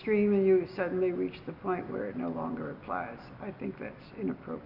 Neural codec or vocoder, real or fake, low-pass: none; real; 5.4 kHz